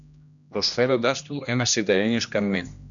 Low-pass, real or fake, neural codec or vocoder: 7.2 kHz; fake; codec, 16 kHz, 1 kbps, X-Codec, HuBERT features, trained on general audio